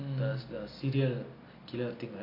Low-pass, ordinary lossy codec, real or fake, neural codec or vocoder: 5.4 kHz; AAC, 48 kbps; real; none